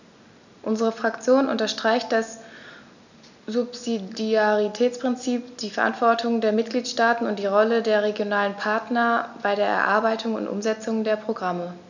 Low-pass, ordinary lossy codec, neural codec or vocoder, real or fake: 7.2 kHz; none; none; real